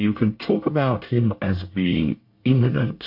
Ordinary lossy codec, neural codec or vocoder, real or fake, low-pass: MP3, 32 kbps; codec, 24 kHz, 1 kbps, SNAC; fake; 5.4 kHz